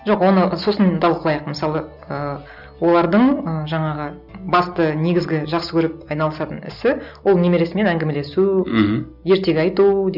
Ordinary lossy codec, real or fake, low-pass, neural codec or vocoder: none; real; 5.4 kHz; none